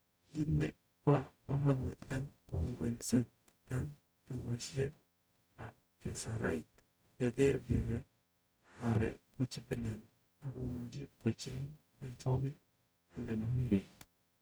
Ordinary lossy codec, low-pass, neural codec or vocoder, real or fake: none; none; codec, 44.1 kHz, 0.9 kbps, DAC; fake